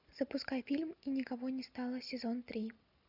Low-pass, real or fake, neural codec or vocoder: 5.4 kHz; real; none